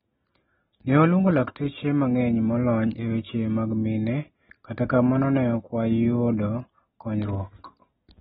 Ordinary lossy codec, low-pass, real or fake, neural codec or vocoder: AAC, 16 kbps; 10.8 kHz; real; none